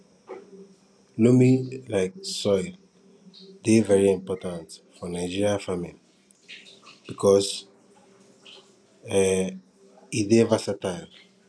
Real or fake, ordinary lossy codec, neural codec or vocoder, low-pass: real; none; none; none